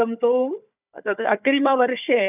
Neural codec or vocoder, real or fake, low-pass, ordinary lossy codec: codec, 16 kHz, 4 kbps, FunCodec, trained on Chinese and English, 50 frames a second; fake; 3.6 kHz; none